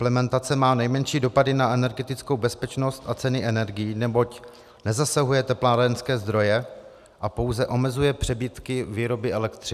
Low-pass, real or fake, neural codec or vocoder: 14.4 kHz; real; none